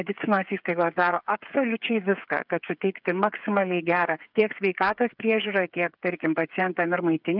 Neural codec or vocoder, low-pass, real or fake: codec, 16 kHz, 8 kbps, FreqCodec, smaller model; 5.4 kHz; fake